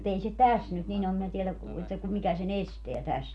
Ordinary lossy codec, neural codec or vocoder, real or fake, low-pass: none; none; real; none